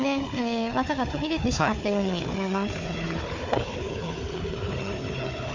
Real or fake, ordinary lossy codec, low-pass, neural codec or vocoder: fake; MP3, 48 kbps; 7.2 kHz; codec, 16 kHz, 4 kbps, FunCodec, trained on Chinese and English, 50 frames a second